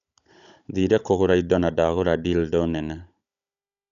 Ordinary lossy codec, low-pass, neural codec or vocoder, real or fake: none; 7.2 kHz; codec, 16 kHz, 16 kbps, FunCodec, trained on Chinese and English, 50 frames a second; fake